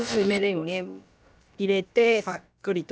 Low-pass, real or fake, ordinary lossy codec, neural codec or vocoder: none; fake; none; codec, 16 kHz, about 1 kbps, DyCAST, with the encoder's durations